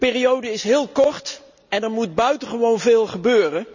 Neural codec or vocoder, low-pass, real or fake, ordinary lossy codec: none; 7.2 kHz; real; none